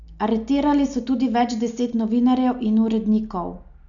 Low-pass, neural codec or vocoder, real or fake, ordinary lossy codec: 7.2 kHz; none; real; none